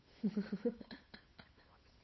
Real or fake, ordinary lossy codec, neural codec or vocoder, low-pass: fake; MP3, 24 kbps; codec, 16 kHz, 8 kbps, FunCodec, trained on Chinese and English, 25 frames a second; 7.2 kHz